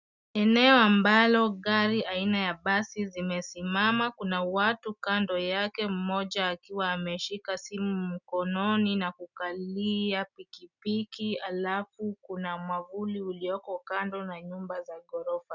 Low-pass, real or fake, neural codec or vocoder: 7.2 kHz; real; none